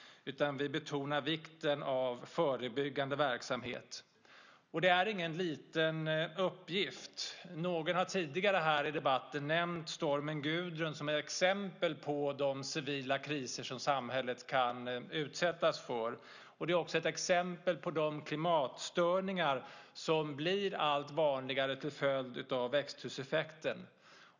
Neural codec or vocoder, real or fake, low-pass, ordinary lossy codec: none; real; 7.2 kHz; none